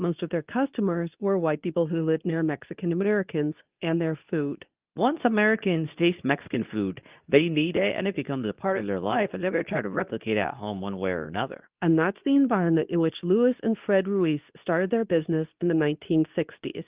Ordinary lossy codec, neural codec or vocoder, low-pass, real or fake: Opus, 24 kbps; codec, 24 kHz, 0.9 kbps, WavTokenizer, medium speech release version 2; 3.6 kHz; fake